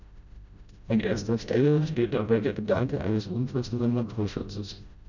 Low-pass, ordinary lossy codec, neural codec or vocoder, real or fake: 7.2 kHz; none; codec, 16 kHz, 0.5 kbps, FreqCodec, smaller model; fake